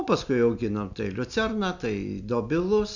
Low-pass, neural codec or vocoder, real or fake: 7.2 kHz; none; real